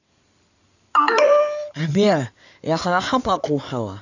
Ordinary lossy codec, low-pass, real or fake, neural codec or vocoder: none; 7.2 kHz; fake; codec, 16 kHz in and 24 kHz out, 2.2 kbps, FireRedTTS-2 codec